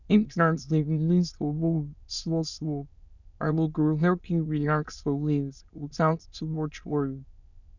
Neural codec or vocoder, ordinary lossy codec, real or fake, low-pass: autoencoder, 22.05 kHz, a latent of 192 numbers a frame, VITS, trained on many speakers; none; fake; 7.2 kHz